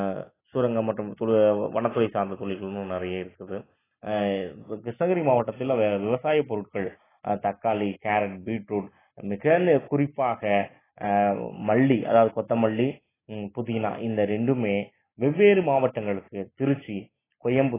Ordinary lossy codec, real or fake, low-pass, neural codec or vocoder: AAC, 16 kbps; real; 3.6 kHz; none